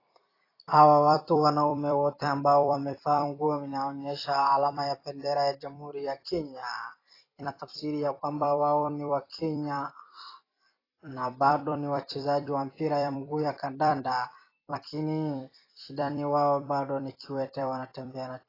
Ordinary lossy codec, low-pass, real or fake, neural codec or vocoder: AAC, 24 kbps; 5.4 kHz; fake; vocoder, 44.1 kHz, 128 mel bands every 256 samples, BigVGAN v2